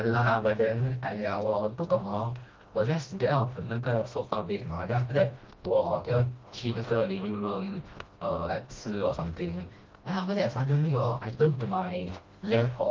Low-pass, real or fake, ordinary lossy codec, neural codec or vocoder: 7.2 kHz; fake; Opus, 24 kbps; codec, 16 kHz, 1 kbps, FreqCodec, smaller model